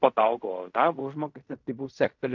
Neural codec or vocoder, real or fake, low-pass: codec, 16 kHz in and 24 kHz out, 0.4 kbps, LongCat-Audio-Codec, fine tuned four codebook decoder; fake; 7.2 kHz